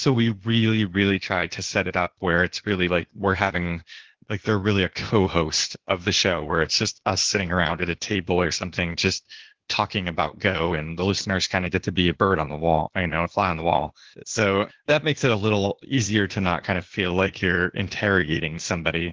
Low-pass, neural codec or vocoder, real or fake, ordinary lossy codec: 7.2 kHz; codec, 16 kHz, 0.8 kbps, ZipCodec; fake; Opus, 16 kbps